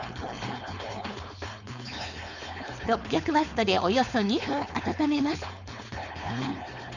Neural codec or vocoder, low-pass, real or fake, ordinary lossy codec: codec, 16 kHz, 4.8 kbps, FACodec; 7.2 kHz; fake; none